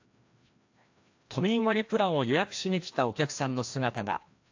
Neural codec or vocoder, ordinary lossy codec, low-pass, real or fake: codec, 16 kHz, 1 kbps, FreqCodec, larger model; AAC, 48 kbps; 7.2 kHz; fake